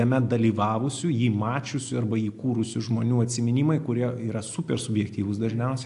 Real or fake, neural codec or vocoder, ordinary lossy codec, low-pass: real; none; AAC, 64 kbps; 10.8 kHz